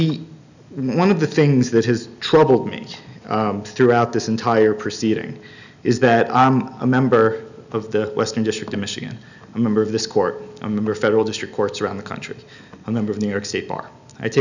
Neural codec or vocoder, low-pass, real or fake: none; 7.2 kHz; real